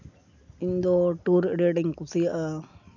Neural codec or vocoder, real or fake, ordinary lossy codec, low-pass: none; real; none; 7.2 kHz